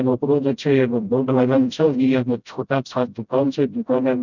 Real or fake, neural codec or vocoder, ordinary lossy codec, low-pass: fake; codec, 16 kHz, 0.5 kbps, FreqCodec, smaller model; none; 7.2 kHz